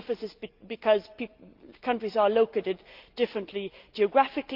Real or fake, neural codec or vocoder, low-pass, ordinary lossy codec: real; none; 5.4 kHz; Opus, 32 kbps